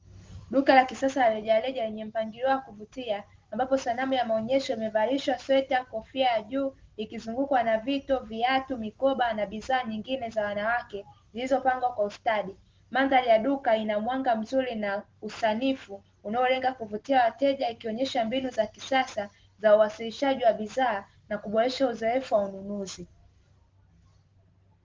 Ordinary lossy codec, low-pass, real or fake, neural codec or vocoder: Opus, 16 kbps; 7.2 kHz; real; none